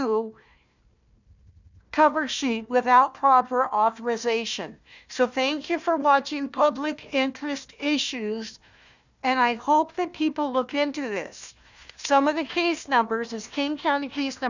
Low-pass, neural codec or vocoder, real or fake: 7.2 kHz; codec, 16 kHz, 1 kbps, FunCodec, trained on Chinese and English, 50 frames a second; fake